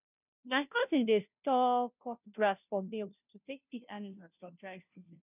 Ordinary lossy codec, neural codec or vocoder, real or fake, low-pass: none; codec, 16 kHz, 0.5 kbps, FunCodec, trained on Chinese and English, 25 frames a second; fake; 3.6 kHz